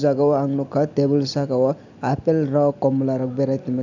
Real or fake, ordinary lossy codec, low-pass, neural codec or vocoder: real; none; 7.2 kHz; none